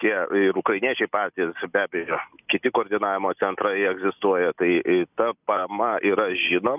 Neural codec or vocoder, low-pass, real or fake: none; 3.6 kHz; real